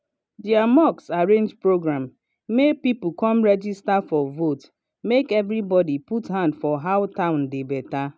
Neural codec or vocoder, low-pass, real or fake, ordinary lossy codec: none; none; real; none